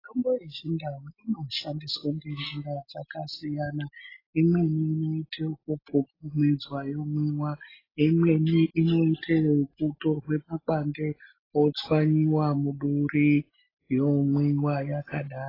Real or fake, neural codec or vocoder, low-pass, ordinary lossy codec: real; none; 5.4 kHz; AAC, 24 kbps